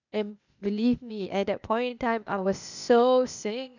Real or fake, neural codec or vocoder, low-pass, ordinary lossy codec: fake; codec, 16 kHz, 0.8 kbps, ZipCodec; 7.2 kHz; none